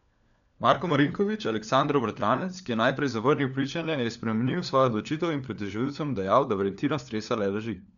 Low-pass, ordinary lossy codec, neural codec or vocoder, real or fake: 7.2 kHz; none; codec, 16 kHz, 4 kbps, FunCodec, trained on LibriTTS, 50 frames a second; fake